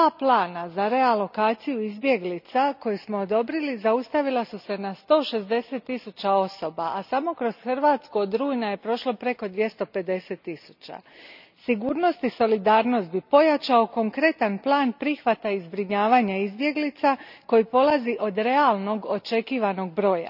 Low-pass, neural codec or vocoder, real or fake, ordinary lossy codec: 5.4 kHz; none; real; none